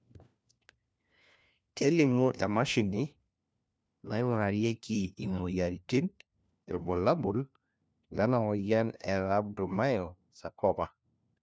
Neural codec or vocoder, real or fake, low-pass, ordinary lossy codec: codec, 16 kHz, 1 kbps, FunCodec, trained on LibriTTS, 50 frames a second; fake; none; none